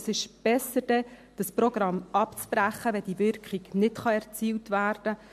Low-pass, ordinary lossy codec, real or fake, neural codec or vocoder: 14.4 kHz; MP3, 64 kbps; real; none